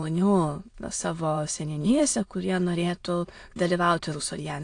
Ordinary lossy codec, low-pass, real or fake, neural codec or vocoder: AAC, 48 kbps; 9.9 kHz; fake; autoencoder, 22.05 kHz, a latent of 192 numbers a frame, VITS, trained on many speakers